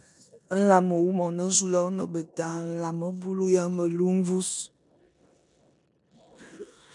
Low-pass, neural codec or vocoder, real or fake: 10.8 kHz; codec, 16 kHz in and 24 kHz out, 0.9 kbps, LongCat-Audio-Codec, four codebook decoder; fake